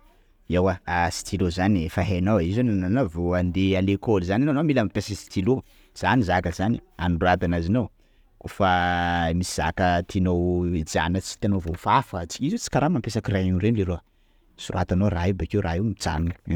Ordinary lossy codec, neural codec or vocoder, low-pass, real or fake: none; none; 19.8 kHz; real